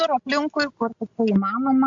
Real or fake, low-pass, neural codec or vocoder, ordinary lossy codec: real; 7.2 kHz; none; MP3, 64 kbps